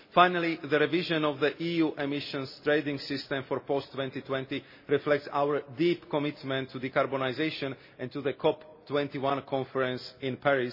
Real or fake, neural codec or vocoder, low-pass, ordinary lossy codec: real; none; 5.4 kHz; MP3, 24 kbps